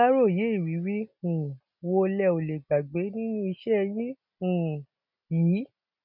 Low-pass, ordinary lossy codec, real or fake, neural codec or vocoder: 5.4 kHz; none; real; none